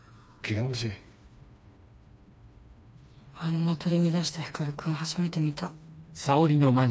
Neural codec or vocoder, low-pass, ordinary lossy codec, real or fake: codec, 16 kHz, 2 kbps, FreqCodec, smaller model; none; none; fake